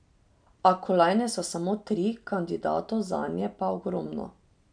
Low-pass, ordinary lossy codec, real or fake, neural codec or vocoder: 9.9 kHz; none; real; none